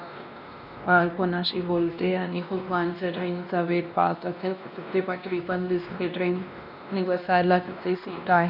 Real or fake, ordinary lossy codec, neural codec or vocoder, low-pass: fake; none; codec, 16 kHz, 1 kbps, X-Codec, WavLM features, trained on Multilingual LibriSpeech; 5.4 kHz